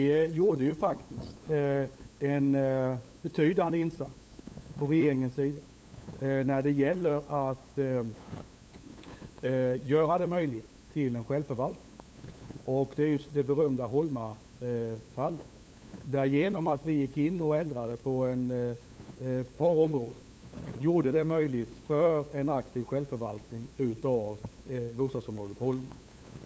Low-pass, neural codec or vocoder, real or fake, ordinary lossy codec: none; codec, 16 kHz, 8 kbps, FunCodec, trained on LibriTTS, 25 frames a second; fake; none